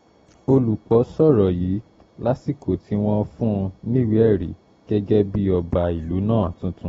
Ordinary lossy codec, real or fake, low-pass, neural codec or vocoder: AAC, 24 kbps; real; 10.8 kHz; none